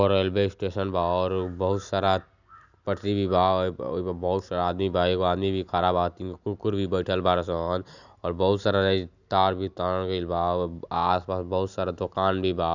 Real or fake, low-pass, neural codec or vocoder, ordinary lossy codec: real; 7.2 kHz; none; none